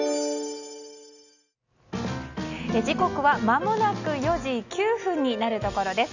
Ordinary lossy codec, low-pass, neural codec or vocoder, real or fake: none; 7.2 kHz; none; real